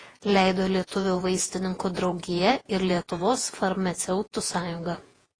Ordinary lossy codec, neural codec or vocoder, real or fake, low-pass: AAC, 32 kbps; vocoder, 48 kHz, 128 mel bands, Vocos; fake; 9.9 kHz